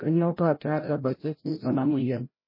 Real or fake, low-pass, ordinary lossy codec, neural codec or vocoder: fake; 5.4 kHz; MP3, 24 kbps; codec, 16 kHz, 0.5 kbps, FreqCodec, larger model